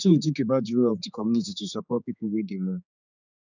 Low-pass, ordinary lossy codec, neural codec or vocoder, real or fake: 7.2 kHz; none; codec, 16 kHz, 4 kbps, X-Codec, HuBERT features, trained on general audio; fake